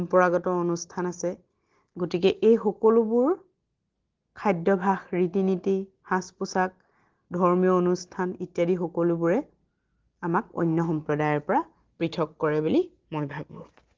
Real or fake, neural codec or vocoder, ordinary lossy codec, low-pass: real; none; Opus, 16 kbps; 7.2 kHz